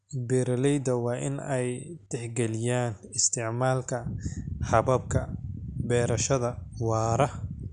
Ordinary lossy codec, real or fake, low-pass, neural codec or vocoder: none; real; 9.9 kHz; none